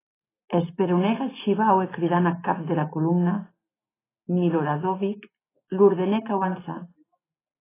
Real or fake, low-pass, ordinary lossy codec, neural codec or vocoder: real; 3.6 kHz; AAC, 16 kbps; none